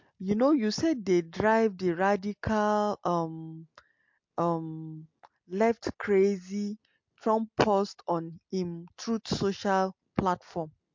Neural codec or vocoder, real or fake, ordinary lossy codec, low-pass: none; real; MP3, 48 kbps; 7.2 kHz